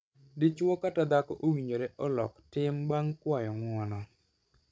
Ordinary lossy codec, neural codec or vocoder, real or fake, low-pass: none; codec, 16 kHz, 16 kbps, FreqCodec, larger model; fake; none